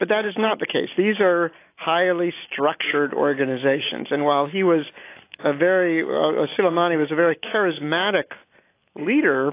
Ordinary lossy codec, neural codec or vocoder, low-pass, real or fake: AAC, 24 kbps; none; 3.6 kHz; real